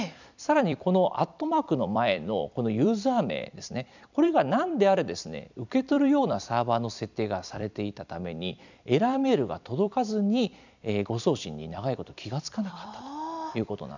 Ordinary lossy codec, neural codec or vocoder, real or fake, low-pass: none; vocoder, 44.1 kHz, 128 mel bands every 256 samples, BigVGAN v2; fake; 7.2 kHz